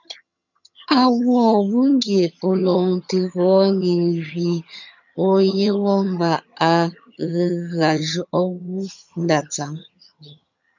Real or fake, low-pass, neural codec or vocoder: fake; 7.2 kHz; vocoder, 22.05 kHz, 80 mel bands, HiFi-GAN